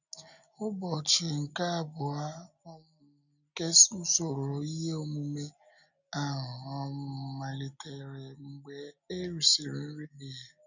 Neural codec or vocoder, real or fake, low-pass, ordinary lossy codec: none; real; 7.2 kHz; none